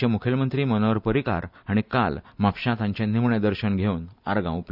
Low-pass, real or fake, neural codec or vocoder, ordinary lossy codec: 5.4 kHz; fake; vocoder, 44.1 kHz, 128 mel bands every 256 samples, BigVGAN v2; none